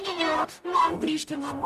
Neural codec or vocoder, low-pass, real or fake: codec, 44.1 kHz, 0.9 kbps, DAC; 14.4 kHz; fake